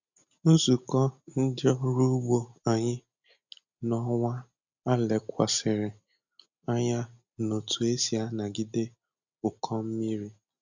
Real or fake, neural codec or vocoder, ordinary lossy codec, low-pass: real; none; none; 7.2 kHz